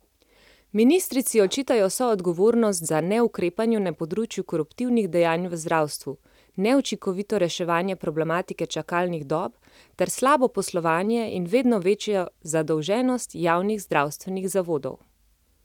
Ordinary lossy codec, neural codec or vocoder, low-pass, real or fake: none; none; 19.8 kHz; real